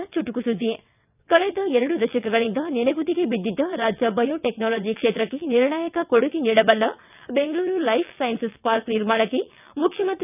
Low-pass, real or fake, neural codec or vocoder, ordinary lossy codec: 3.6 kHz; fake; vocoder, 22.05 kHz, 80 mel bands, WaveNeXt; none